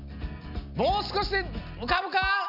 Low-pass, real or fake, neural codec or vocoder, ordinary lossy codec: 5.4 kHz; real; none; none